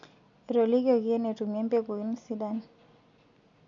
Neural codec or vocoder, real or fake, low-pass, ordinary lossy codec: none; real; 7.2 kHz; none